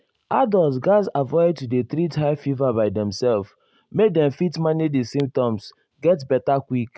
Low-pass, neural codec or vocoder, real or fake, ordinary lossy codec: none; none; real; none